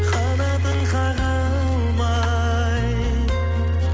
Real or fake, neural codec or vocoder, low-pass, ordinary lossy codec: real; none; none; none